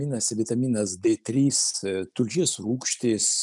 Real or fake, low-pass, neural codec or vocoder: real; 10.8 kHz; none